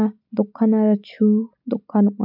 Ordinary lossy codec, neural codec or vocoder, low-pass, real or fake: none; none; 5.4 kHz; real